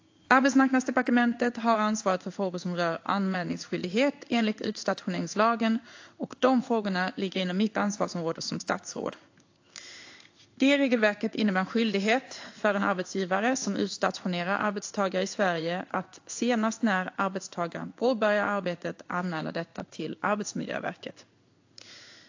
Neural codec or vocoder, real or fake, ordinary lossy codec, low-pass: codec, 16 kHz in and 24 kHz out, 1 kbps, XY-Tokenizer; fake; AAC, 48 kbps; 7.2 kHz